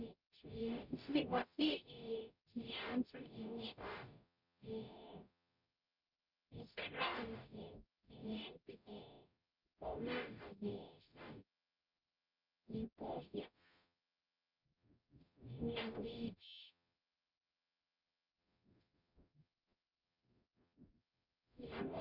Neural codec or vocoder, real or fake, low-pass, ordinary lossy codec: codec, 44.1 kHz, 0.9 kbps, DAC; fake; 5.4 kHz; none